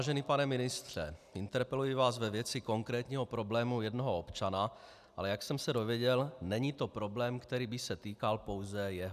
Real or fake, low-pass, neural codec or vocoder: real; 14.4 kHz; none